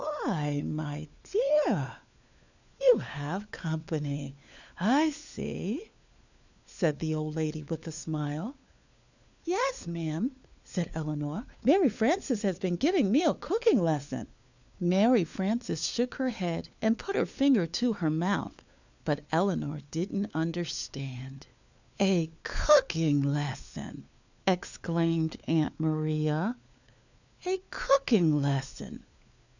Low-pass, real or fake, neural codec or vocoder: 7.2 kHz; fake; codec, 16 kHz, 4 kbps, FunCodec, trained on LibriTTS, 50 frames a second